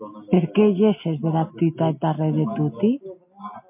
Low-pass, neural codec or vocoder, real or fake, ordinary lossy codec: 3.6 kHz; none; real; MP3, 24 kbps